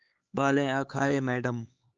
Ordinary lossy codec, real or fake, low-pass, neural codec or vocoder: Opus, 24 kbps; fake; 7.2 kHz; codec, 16 kHz, 4 kbps, X-Codec, HuBERT features, trained on LibriSpeech